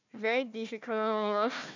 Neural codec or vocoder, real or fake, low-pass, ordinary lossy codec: codec, 16 kHz, 1 kbps, FunCodec, trained on Chinese and English, 50 frames a second; fake; 7.2 kHz; none